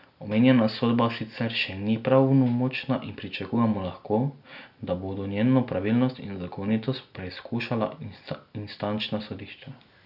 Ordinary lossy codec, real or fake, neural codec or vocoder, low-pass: none; real; none; 5.4 kHz